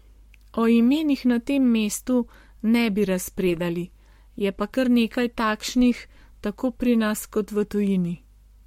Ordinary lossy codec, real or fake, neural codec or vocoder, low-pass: MP3, 64 kbps; fake; codec, 44.1 kHz, 7.8 kbps, Pupu-Codec; 19.8 kHz